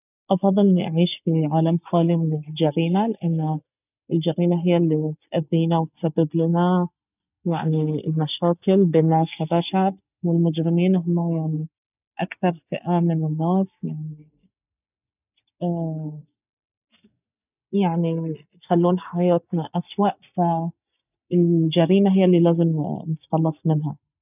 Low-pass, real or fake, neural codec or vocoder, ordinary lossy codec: 3.6 kHz; real; none; none